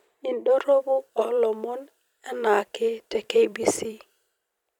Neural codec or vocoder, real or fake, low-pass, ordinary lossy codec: vocoder, 44.1 kHz, 128 mel bands every 256 samples, BigVGAN v2; fake; 19.8 kHz; none